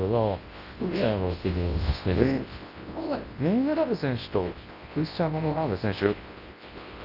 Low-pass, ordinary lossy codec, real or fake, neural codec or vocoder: 5.4 kHz; Opus, 24 kbps; fake; codec, 24 kHz, 0.9 kbps, WavTokenizer, large speech release